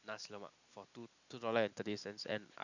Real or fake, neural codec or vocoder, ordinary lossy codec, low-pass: real; none; none; 7.2 kHz